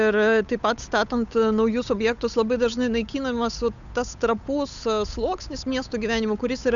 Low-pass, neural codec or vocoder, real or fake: 7.2 kHz; codec, 16 kHz, 8 kbps, FunCodec, trained on Chinese and English, 25 frames a second; fake